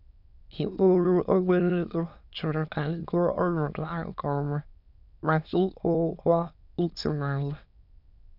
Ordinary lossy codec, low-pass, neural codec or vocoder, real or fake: none; 5.4 kHz; autoencoder, 22.05 kHz, a latent of 192 numbers a frame, VITS, trained on many speakers; fake